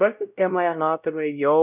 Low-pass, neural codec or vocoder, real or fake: 3.6 kHz; codec, 16 kHz, 0.5 kbps, X-Codec, WavLM features, trained on Multilingual LibriSpeech; fake